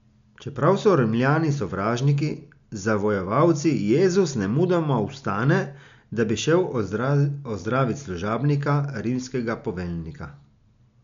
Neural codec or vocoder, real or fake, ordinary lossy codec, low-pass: none; real; MP3, 64 kbps; 7.2 kHz